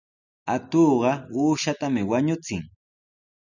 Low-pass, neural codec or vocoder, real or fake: 7.2 kHz; none; real